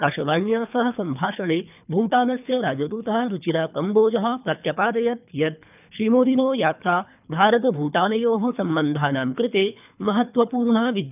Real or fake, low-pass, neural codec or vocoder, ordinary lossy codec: fake; 3.6 kHz; codec, 24 kHz, 3 kbps, HILCodec; none